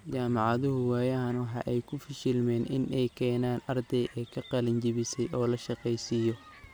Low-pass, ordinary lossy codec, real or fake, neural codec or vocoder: none; none; real; none